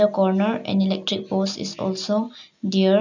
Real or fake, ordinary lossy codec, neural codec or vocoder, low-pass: real; none; none; 7.2 kHz